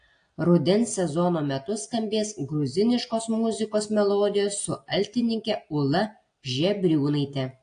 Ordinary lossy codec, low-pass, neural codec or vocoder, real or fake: AAC, 48 kbps; 9.9 kHz; none; real